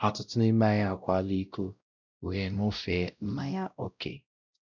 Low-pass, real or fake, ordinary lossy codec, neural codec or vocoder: 7.2 kHz; fake; none; codec, 16 kHz, 0.5 kbps, X-Codec, WavLM features, trained on Multilingual LibriSpeech